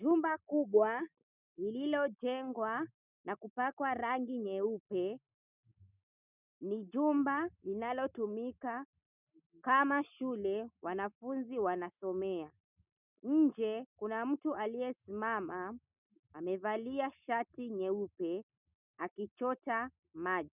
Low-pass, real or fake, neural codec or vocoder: 3.6 kHz; real; none